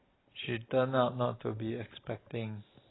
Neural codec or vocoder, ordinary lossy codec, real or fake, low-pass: none; AAC, 16 kbps; real; 7.2 kHz